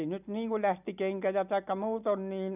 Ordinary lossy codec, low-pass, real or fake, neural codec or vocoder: none; 3.6 kHz; real; none